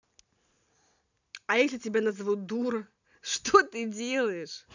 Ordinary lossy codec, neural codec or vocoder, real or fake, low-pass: none; none; real; 7.2 kHz